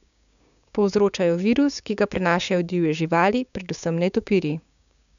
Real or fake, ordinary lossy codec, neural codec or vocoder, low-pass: fake; none; codec, 16 kHz, 6 kbps, DAC; 7.2 kHz